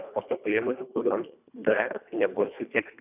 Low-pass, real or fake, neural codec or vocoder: 3.6 kHz; fake; codec, 24 kHz, 1.5 kbps, HILCodec